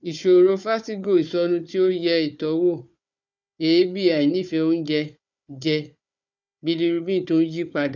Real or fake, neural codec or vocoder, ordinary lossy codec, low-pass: fake; codec, 16 kHz, 4 kbps, FunCodec, trained on Chinese and English, 50 frames a second; none; 7.2 kHz